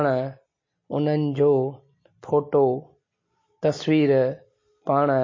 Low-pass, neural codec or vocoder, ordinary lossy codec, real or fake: 7.2 kHz; none; MP3, 32 kbps; real